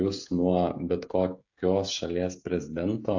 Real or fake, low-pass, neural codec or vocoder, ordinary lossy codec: fake; 7.2 kHz; codec, 16 kHz, 16 kbps, FreqCodec, smaller model; AAC, 48 kbps